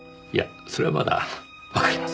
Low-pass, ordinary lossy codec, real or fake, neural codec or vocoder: none; none; real; none